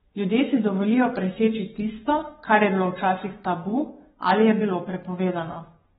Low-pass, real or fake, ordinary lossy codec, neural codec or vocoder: 19.8 kHz; fake; AAC, 16 kbps; codec, 44.1 kHz, 7.8 kbps, Pupu-Codec